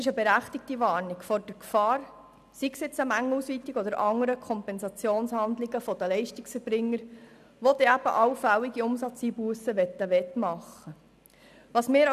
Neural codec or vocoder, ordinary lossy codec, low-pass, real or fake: none; none; 14.4 kHz; real